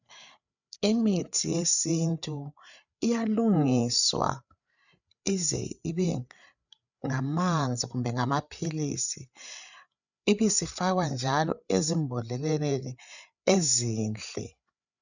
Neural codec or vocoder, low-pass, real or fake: codec, 16 kHz, 8 kbps, FreqCodec, larger model; 7.2 kHz; fake